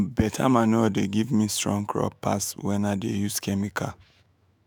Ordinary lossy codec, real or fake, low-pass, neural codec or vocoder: none; fake; none; autoencoder, 48 kHz, 128 numbers a frame, DAC-VAE, trained on Japanese speech